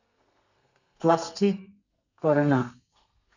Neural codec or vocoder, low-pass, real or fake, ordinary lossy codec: codec, 32 kHz, 1.9 kbps, SNAC; 7.2 kHz; fake; none